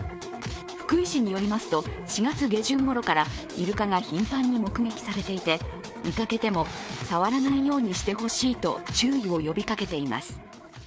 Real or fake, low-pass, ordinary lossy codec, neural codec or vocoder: fake; none; none; codec, 16 kHz, 4 kbps, FreqCodec, larger model